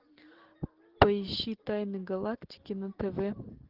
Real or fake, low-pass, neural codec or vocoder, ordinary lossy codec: real; 5.4 kHz; none; Opus, 16 kbps